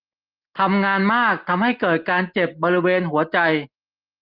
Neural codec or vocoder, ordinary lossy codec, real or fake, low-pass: none; Opus, 32 kbps; real; 5.4 kHz